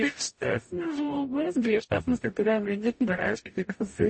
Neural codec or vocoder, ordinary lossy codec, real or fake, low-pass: codec, 44.1 kHz, 0.9 kbps, DAC; MP3, 32 kbps; fake; 9.9 kHz